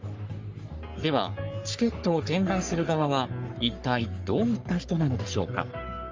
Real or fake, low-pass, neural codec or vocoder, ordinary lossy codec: fake; 7.2 kHz; codec, 44.1 kHz, 3.4 kbps, Pupu-Codec; Opus, 32 kbps